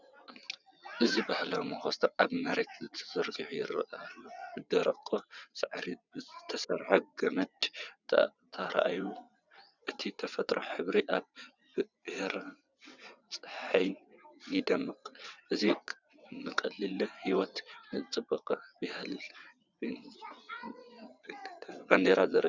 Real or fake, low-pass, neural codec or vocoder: fake; 7.2 kHz; vocoder, 22.05 kHz, 80 mel bands, WaveNeXt